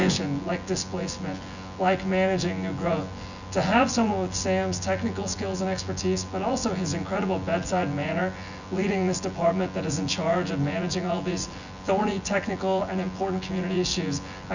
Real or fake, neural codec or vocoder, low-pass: fake; vocoder, 24 kHz, 100 mel bands, Vocos; 7.2 kHz